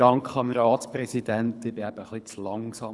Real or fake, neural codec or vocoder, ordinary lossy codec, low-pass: fake; codec, 24 kHz, 6 kbps, HILCodec; none; none